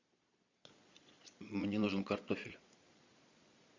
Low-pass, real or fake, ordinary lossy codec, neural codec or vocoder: 7.2 kHz; fake; MP3, 64 kbps; vocoder, 22.05 kHz, 80 mel bands, Vocos